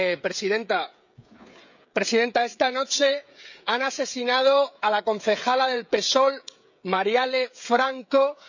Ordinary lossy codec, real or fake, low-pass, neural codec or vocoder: none; fake; 7.2 kHz; codec, 16 kHz, 16 kbps, FreqCodec, smaller model